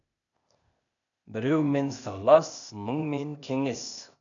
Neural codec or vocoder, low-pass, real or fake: codec, 16 kHz, 0.8 kbps, ZipCodec; 7.2 kHz; fake